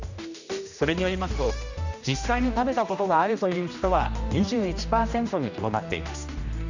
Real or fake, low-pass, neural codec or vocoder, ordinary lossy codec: fake; 7.2 kHz; codec, 16 kHz, 1 kbps, X-Codec, HuBERT features, trained on general audio; none